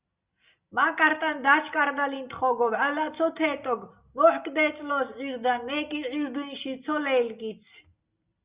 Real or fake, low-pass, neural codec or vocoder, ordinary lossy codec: real; 3.6 kHz; none; Opus, 64 kbps